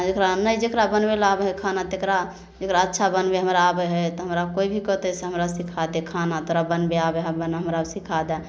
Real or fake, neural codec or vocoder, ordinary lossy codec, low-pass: real; none; none; none